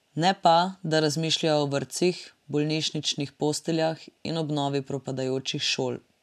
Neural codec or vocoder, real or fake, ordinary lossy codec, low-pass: none; real; none; 14.4 kHz